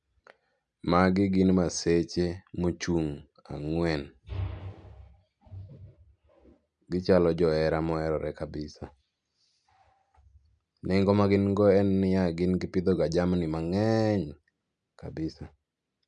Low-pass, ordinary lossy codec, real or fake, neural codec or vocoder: 9.9 kHz; none; real; none